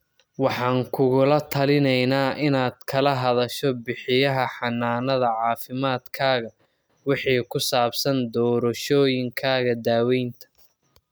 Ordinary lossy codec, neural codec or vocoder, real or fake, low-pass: none; none; real; none